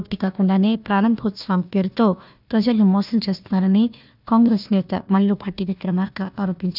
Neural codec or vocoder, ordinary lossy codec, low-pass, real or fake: codec, 16 kHz, 1 kbps, FunCodec, trained on Chinese and English, 50 frames a second; none; 5.4 kHz; fake